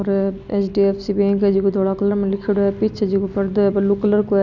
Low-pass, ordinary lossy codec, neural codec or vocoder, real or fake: 7.2 kHz; none; none; real